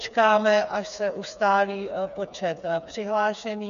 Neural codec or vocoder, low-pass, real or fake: codec, 16 kHz, 4 kbps, FreqCodec, smaller model; 7.2 kHz; fake